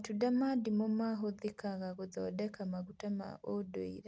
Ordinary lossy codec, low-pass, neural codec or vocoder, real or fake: none; none; none; real